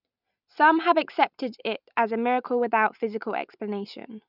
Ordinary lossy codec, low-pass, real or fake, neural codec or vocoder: none; 5.4 kHz; real; none